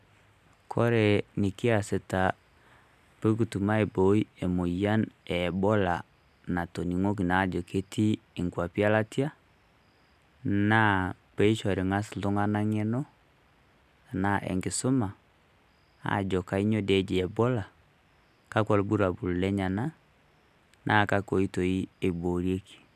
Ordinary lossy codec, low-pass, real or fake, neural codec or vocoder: none; 14.4 kHz; real; none